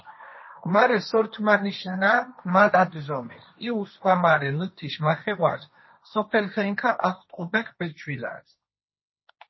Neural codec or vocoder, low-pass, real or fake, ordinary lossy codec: codec, 16 kHz, 1.1 kbps, Voila-Tokenizer; 7.2 kHz; fake; MP3, 24 kbps